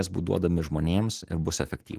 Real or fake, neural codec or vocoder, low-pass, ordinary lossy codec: real; none; 14.4 kHz; Opus, 16 kbps